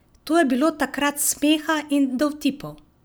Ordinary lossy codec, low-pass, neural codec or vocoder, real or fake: none; none; none; real